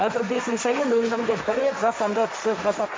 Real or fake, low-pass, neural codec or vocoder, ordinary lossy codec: fake; none; codec, 16 kHz, 1.1 kbps, Voila-Tokenizer; none